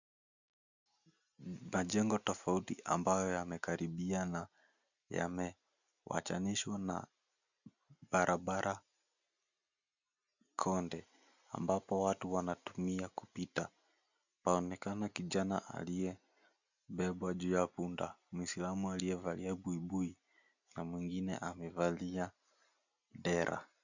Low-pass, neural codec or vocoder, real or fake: 7.2 kHz; none; real